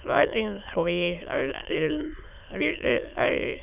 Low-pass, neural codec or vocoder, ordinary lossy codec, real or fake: 3.6 kHz; autoencoder, 22.05 kHz, a latent of 192 numbers a frame, VITS, trained on many speakers; none; fake